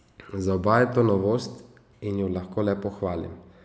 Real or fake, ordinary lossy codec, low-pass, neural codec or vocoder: real; none; none; none